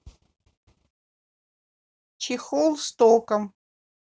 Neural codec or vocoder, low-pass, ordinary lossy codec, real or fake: none; none; none; real